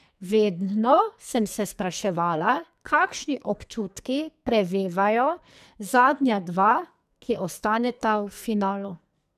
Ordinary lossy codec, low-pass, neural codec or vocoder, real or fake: none; 14.4 kHz; codec, 44.1 kHz, 2.6 kbps, SNAC; fake